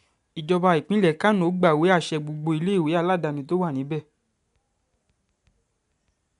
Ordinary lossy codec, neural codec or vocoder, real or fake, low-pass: none; none; real; 10.8 kHz